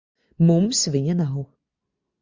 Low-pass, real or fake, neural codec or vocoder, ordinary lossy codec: 7.2 kHz; real; none; Opus, 64 kbps